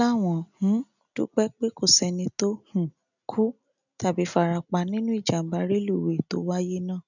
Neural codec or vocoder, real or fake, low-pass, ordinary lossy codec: none; real; 7.2 kHz; none